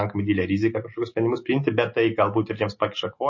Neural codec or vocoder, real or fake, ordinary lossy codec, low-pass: none; real; MP3, 32 kbps; 7.2 kHz